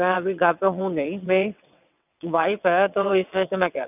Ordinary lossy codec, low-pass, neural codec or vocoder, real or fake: none; 3.6 kHz; vocoder, 22.05 kHz, 80 mel bands, WaveNeXt; fake